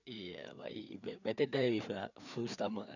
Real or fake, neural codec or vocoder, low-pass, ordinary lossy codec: fake; codec, 16 kHz, 4 kbps, FreqCodec, larger model; 7.2 kHz; none